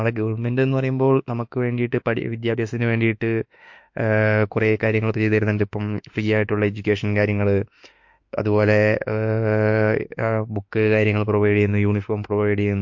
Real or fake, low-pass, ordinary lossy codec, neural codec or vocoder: fake; 7.2 kHz; MP3, 48 kbps; autoencoder, 48 kHz, 32 numbers a frame, DAC-VAE, trained on Japanese speech